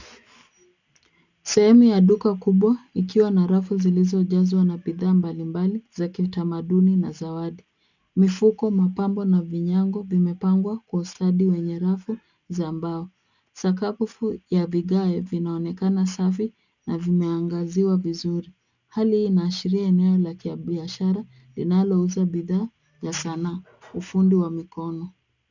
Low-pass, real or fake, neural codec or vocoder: 7.2 kHz; real; none